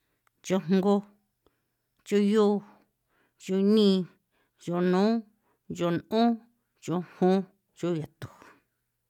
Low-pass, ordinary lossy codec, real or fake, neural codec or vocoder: 19.8 kHz; MP3, 96 kbps; real; none